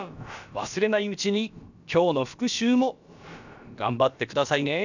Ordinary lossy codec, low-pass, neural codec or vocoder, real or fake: none; 7.2 kHz; codec, 16 kHz, about 1 kbps, DyCAST, with the encoder's durations; fake